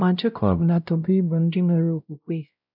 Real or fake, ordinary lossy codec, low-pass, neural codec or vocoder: fake; none; 5.4 kHz; codec, 16 kHz, 0.5 kbps, X-Codec, WavLM features, trained on Multilingual LibriSpeech